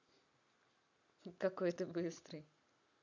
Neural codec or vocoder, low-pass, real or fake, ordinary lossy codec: none; 7.2 kHz; real; none